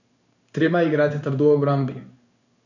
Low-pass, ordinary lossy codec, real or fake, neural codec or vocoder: 7.2 kHz; none; fake; codec, 16 kHz in and 24 kHz out, 1 kbps, XY-Tokenizer